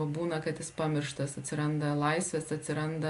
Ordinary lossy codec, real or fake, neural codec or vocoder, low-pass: AAC, 64 kbps; real; none; 10.8 kHz